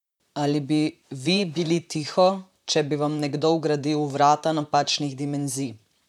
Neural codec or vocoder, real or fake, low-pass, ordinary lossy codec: vocoder, 44.1 kHz, 128 mel bands, Pupu-Vocoder; fake; 19.8 kHz; none